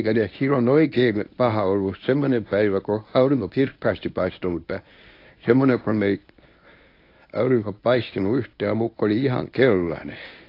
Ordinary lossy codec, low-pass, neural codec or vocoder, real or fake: AAC, 32 kbps; 5.4 kHz; codec, 24 kHz, 0.9 kbps, WavTokenizer, medium speech release version 1; fake